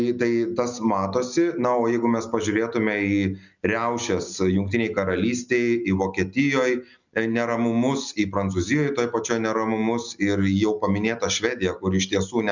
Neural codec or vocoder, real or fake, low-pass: none; real; 7.2 kHz